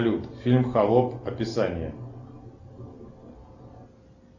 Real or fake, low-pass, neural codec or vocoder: real; 7.2 kHz; none